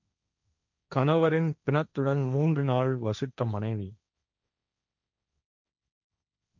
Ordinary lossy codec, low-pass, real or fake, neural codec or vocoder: none; 7.2 kHz; fake; codec, 16 kHz, 1.1 kbps, Voila-Tokenizer